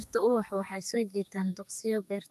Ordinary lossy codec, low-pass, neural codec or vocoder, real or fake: none; 14.4 kHz; codec, 32 kHz, 1.9 kbps, SNAC; fake